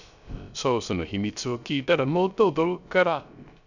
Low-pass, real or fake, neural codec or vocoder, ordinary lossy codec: 7.2 kHz; fake; codec, 16 kHz, 0.3 kbps, FocalCodec; none